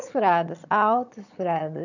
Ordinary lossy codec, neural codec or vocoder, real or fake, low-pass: none; vocoder, 22.05 kHz, 80 mel bands, HiFi-GAN; fake; 7.2 kHz